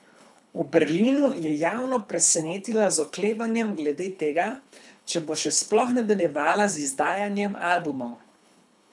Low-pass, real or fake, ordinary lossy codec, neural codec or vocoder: none; fake; none; codec, 24 kHz, 3 kbps, HILCodec